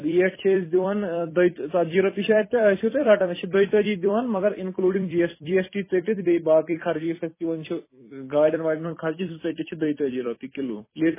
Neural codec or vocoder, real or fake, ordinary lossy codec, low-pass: vocoder, 44.1 kHz, 128 mel bands every 256 samples, BigVGAN v2; fake; MP3, 16 kbps; 3.6 kHz